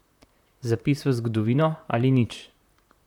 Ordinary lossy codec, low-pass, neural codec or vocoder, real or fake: none; 19.8 kHz; vocoder, 44.1 kHz, 128 mel bands, Pupu-Vocoder; fake